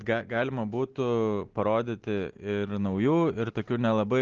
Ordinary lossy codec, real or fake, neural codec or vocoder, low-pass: Opus, 16 kbps; real; none; 7.2 kHz